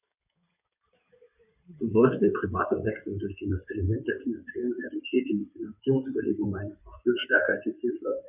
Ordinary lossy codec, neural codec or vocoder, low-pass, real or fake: none; codec, 16 kHz in and 24 kHz out, 2.2 kbps, FireRedTTS-2 codec; 3.6 kHz; fake